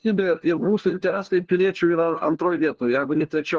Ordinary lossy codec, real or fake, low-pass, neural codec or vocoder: Opus, 16 kbps; fake; 7.2 kHz; codec, 16 kHz, 1 kbps, FunCodec, trained on LibriTTS, 50 frames a second